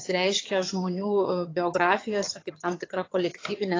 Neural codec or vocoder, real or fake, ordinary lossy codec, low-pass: vocoder, 22.05 kHz, 80 mel bands, HiFi-GAN; fake; AAC, 32 kbps; 7.2 kHz